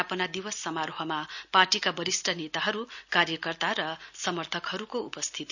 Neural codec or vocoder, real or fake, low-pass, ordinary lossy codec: none; real; 7.2 kHz; none